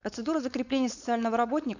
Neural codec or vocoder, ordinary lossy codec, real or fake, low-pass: codec, 16 kHz, 4.8 kbps, FACodec; none; fake; 7.2 kHz